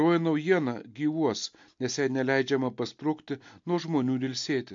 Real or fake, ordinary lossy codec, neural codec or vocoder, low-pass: real; MP3, 48 kbps; none; 7.2 kHz